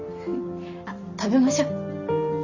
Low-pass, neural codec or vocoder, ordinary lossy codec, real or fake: 7.2 kHz; none; Opus, 64 kbps; real